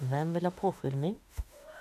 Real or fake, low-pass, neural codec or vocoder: fake; 14.4 kHz; autoencoder, 48 kHz, 32 numbers a frame, DAC-VAE, trained on Japanese speech